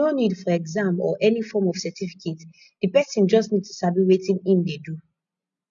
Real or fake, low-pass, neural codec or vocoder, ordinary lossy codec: real; 7.2 kHz; none; none